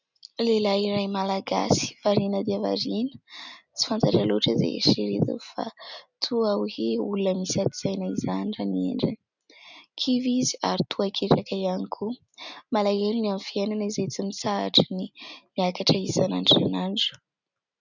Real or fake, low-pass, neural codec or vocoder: real; 7.2 kHz; none